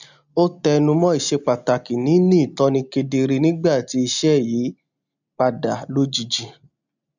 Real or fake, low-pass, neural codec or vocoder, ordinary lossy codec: real; 7.2 kHz; none; none